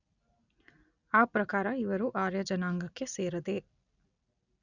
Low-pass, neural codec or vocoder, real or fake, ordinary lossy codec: 7.2 kHz; none; real; none